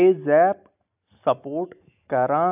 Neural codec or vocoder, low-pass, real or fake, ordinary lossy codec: none; 3.6 kHz; real; AAC, 32 kbps